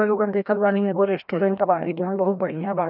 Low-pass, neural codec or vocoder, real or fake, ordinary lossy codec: 5.4 kHz; codec, 16 kHz, 1 kbps, FreqCodec, larger model; fake; none